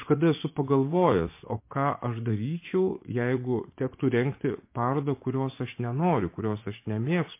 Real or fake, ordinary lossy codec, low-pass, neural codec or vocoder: fake; MP3, 24 kbps; 3.6 kHz; vocoder, 24 kHz, 100 mel bands, Vocos